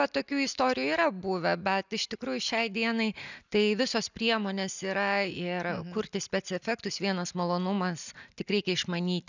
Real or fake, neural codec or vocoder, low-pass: real; none; 7.2 kHz